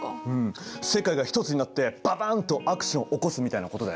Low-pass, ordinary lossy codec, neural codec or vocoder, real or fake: none; none; none; real